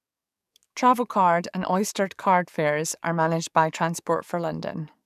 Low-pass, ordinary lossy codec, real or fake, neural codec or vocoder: 14.4 kHz; none; fake; codec, 44.1 kHz, 7.8 kbps, DAC